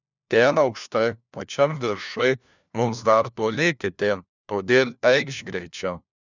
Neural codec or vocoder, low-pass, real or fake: codec, 16 kHz, 1 kbps, FunCodec, trained on LibriTTS, 50 frames a second; 7.2 kHz; fake